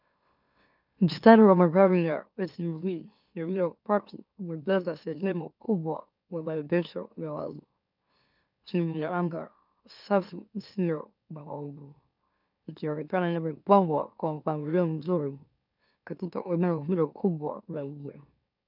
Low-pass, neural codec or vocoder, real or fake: 5.4 kHz; autoencoder, 44.1 kHz, a latent of 192 numbers a frame, MeloTTS; fake